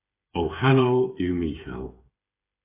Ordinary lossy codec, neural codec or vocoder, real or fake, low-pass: AAC, 24 kbps; codec, 16 kHz, 8 kbps, FreqCodec, smaller model; fake; 3.6 kHz